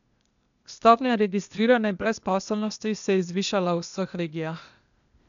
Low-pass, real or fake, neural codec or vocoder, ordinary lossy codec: 7.2 kHz; fake; codec, 16 kHz, 0.8 kbps, ZipCodec; none